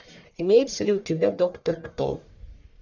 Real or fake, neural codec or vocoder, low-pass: fake; codec, 44.1 kHz, 1.7 kbps, Pupu-Codec; 7.2 kHz